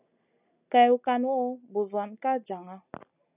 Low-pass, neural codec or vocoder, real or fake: 3.6 kHz; none; real